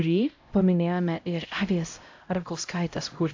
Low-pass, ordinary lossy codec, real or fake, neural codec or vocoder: 7.2 kHz; AAC, 48 kbps; fake; codec, 16 kHz, 0.5 kbps, X-Codec, HuBERT features, trained on LibriSpeech